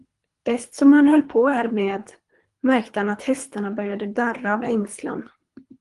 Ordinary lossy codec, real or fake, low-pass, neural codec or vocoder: Opus, 24 kbps; fake; 10.8 kHz; codec, 24 kHz, 3 kbps, HILCodec